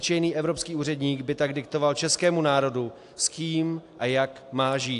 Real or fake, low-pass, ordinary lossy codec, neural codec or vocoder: real; 10.8 kHz; AAC, 64 kbps; none